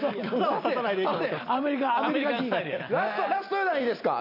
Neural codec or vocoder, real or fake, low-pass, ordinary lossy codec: none; real; 5.4 kHz; none